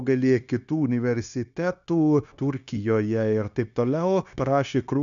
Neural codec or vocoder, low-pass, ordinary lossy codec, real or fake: codec, 16 kHz, 0.9 kbps, LongCat-Audio-Codec; 7.2 kHz; MP3, 96 kbps; fake